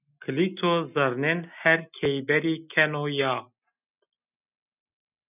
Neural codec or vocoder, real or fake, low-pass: none; real; 3.6 kHz